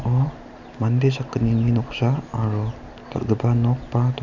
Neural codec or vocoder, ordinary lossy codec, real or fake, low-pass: none; none; real; 7.2 kHz